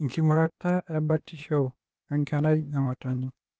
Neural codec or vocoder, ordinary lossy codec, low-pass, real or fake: codec, 16 kHz, 0.8 kbps, ZipCodec; none; none; fake